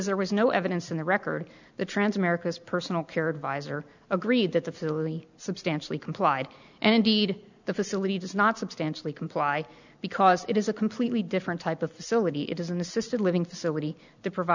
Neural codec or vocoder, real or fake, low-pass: none; real; 7.2 kHz